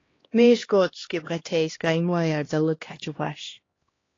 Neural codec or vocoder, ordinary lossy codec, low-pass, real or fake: codec, 16 kHz, 1 kbps, X-Codec, HuBERT features, trained on LibriSpeech; AAC, 32 kbps; 7.2 kHz; fake